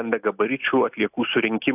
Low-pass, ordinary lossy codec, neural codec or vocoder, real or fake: 3.6 kHz; AAC, 32 kbps; none; real